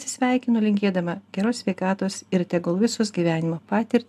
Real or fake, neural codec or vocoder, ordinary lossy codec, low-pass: real; none; AAC, 96 kbps; 14.4 kHz